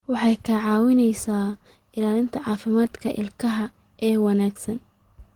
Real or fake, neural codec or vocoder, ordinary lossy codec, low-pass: real; none; Opus, 16 kbps; 19.8 kHz